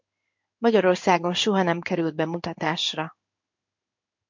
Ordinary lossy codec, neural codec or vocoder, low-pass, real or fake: MP3, 48 kbps; codec, 16 kHz in and 24 kHz out, 1 kbps, XY-Tokenizer; 7.2 kHz; fake